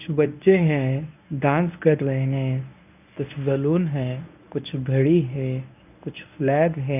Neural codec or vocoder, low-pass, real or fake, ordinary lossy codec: codec, 24 kHz, 0.9 kbps, WavTokenizer, medium speech release version 1; 3.6 kHz; fake; none